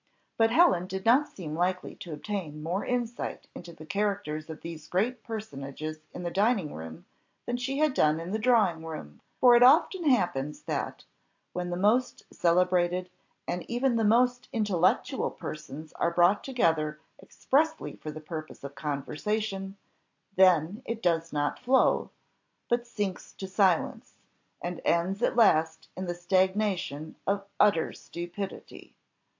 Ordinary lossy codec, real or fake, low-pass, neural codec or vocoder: AAC, 48 kbps; real; 7.2 kHz; none